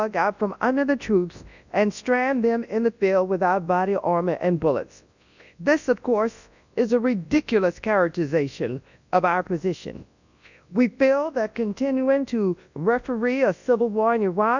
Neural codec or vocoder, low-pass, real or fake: codec, 24 kHz, 0.9 kbps, WavTokenizer, large speech release; 7.2 kHz; fake